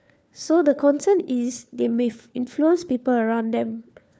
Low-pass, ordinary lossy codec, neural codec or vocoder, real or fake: none; none; codec, 16 kHz, 4 kbps, FunCodec, trained on LibriTTS, 50 frames a second; fake